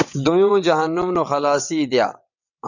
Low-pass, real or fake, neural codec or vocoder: 7.2 kHz; fake; vocoder, 22.05 kHz, 80 mel bands, WaveNeXt